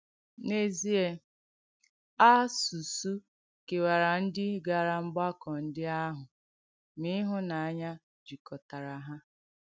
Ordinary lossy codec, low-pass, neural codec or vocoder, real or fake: none; none; none; real